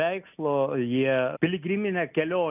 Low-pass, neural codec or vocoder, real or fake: 3.6 kHz; none; real